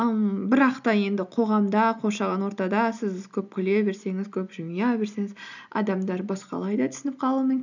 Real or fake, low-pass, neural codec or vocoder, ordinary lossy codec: real; 7.2 kHz; none; none